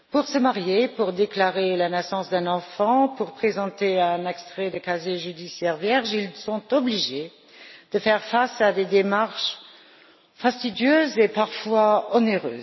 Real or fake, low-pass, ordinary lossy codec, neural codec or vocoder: real; 7.2 kHz; MP3, 24 kbps; none